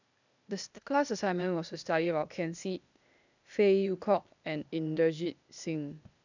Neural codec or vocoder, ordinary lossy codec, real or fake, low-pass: codec, 16 kHz, 0.8 kbps, ZipCodec; none; fake; 7.2 kHz